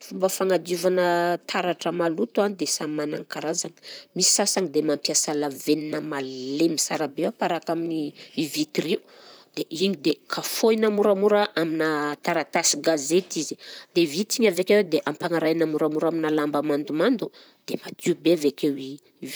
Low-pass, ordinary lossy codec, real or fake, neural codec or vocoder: none; none; fake; vocoder, 44.1 kHz, 128 mel bands, Pupu-Vocoder